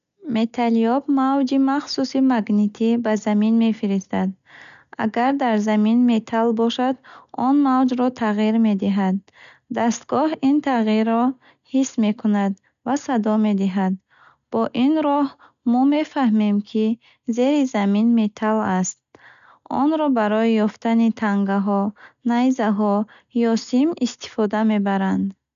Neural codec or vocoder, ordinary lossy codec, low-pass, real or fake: none; none; 7.2 kHz; real